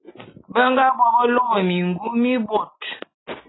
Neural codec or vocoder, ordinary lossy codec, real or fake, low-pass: none; AAC, 16 kbps; real; 7.2 kHz